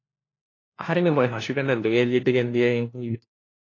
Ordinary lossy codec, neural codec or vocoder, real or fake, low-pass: AAC, 32 kbps; codec, 16 kHz, 1 kbps, FunCodec, trained on LibriTTS, 50 frames a second; fake; 7.2 kHz